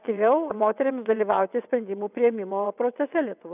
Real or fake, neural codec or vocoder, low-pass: fake; vocoder, 22.05 kHz, 80 mel bands, WaveNeXt; 3.6 kHz